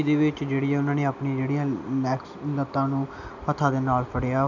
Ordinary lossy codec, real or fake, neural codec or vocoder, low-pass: none; real; none; 7.2 kHz